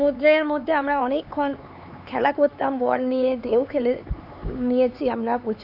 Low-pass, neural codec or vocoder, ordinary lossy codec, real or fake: 5.4 kHz; codec, 16 kHz, 4 kbps, X-Codec, HuBERT features, trained on LibriSpeech; none; fake